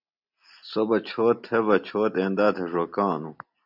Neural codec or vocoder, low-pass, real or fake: none; 5.4 kHz; real